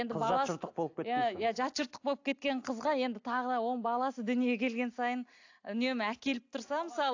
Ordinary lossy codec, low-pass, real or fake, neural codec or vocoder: AAC, 48 kbps; 7.2 kHz; real; none